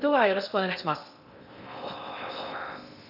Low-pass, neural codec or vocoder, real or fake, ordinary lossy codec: 5.4 kHz; codec, 16 kHz in and 24 kHz out, 0.6 kbps, FocalCodec, streaming, 2048 codes; fake; none